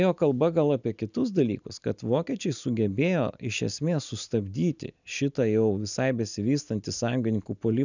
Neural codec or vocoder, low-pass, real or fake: none; 7.2 kHz; real